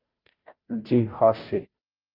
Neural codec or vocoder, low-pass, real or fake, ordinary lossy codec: codec, 16 kHz, 0.5 kbps, FunCodec, trained on Chinese and English, 25 frames a second; 5.4 kHz; fake; Opus, 32 kbps